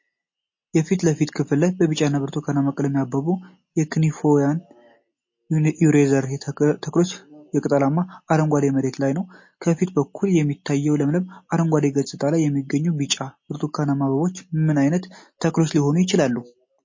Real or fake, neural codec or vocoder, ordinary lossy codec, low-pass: real; none; MP3, 32 kbps; 7.2 kHz